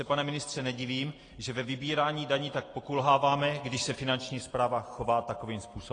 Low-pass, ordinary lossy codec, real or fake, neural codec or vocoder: 9.9 kHz; AAC, 32 kbps; real; none